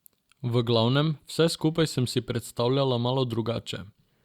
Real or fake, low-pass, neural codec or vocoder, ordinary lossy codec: real; 19.8 kHz; none; Opus, 64 kbps